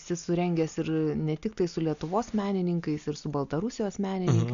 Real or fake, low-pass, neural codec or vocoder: real; 7.2 kHz; none